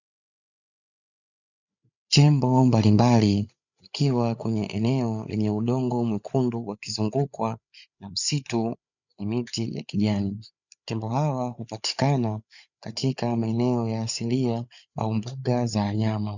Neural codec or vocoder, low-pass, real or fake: codec, 16 kHz, 8 kbps, FreqCodec, larger model; 7.2 kHz; fake